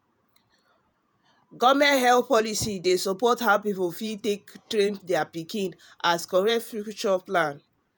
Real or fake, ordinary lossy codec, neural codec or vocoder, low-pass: fake; none; vocoder, 48 kHz, 128 mel bands, Vocos; none